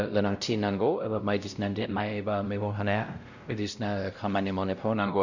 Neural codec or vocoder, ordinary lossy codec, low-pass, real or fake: codec, 16 kHz, 0.5 kbps, X-Codec, WavLM features, trained on Multilingual LibriSpeech; none; 7.2 kHz; fake